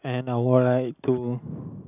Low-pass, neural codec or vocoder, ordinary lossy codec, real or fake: 3.6 kHz; none; none; real